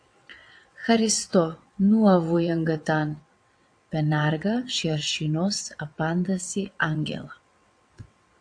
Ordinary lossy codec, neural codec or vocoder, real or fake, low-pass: AAC, 64 kbps; vocoder, 22.05 kHz, 80 mel bands, WaveNeXt; fake; 9.9 kHz